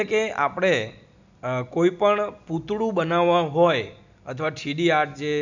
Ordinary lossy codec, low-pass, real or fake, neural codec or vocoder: none; 7.2 kHz; real; none